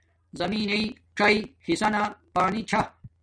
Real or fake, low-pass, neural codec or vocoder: real; 9.9 kHz; none